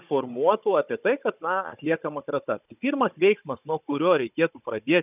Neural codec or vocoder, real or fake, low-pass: codec, 16 kHz, 16 kbps, FunCodec, trained on Chinese and English, 50 frames a second; fake; 3.6 kHz